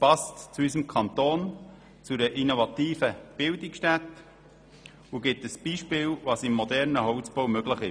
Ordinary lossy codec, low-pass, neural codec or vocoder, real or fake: none; 9.9 kHz; none; real